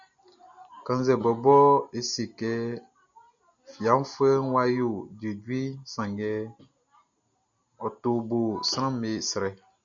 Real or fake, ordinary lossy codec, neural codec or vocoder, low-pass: real; MP3, 96 kbps; none; 7.2 kHz